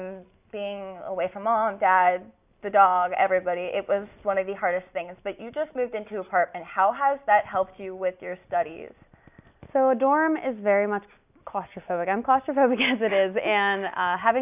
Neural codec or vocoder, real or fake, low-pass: none; real; 3.6 kHz